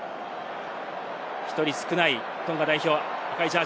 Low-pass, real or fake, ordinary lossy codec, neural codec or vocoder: none; real; none; none